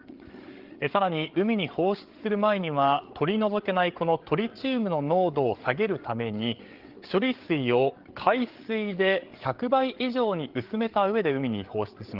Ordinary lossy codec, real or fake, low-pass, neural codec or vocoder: Opus, 16 kbps; fake; 5.4 kHz; codec, 16 kHz, 8 kbps, FreqCodec, larger model